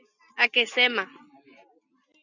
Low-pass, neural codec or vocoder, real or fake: 7.2 kHz; none; real